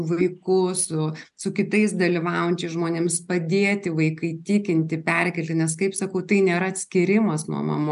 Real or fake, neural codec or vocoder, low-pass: real; none; 10.8 kHz